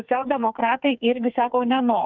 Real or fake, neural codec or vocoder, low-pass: fake; codec, 44.1 kHz, 2.6 kbps, SNAC; 7.2 kHz